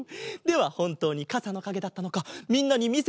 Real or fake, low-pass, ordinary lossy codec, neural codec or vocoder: real; none; none; none